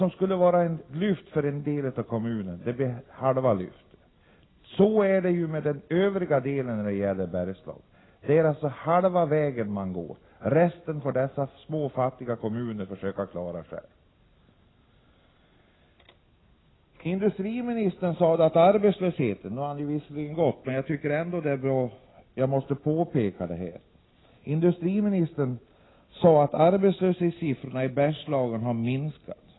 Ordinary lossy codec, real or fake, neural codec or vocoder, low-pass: AAC, 16 kbps; real; none; 7.2 kHz